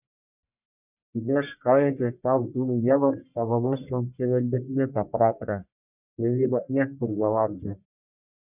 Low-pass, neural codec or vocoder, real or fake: 3.6 kHz; codec, 44.1 kHz, 1.7 kbps, Pupu-Codec; fake